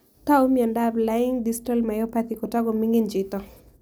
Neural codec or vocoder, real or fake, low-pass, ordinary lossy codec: none; real; none; none